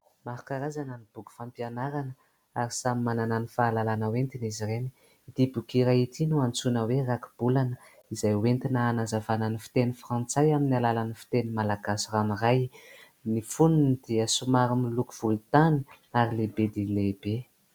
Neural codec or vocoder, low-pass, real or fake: none; 19.8 kHz; real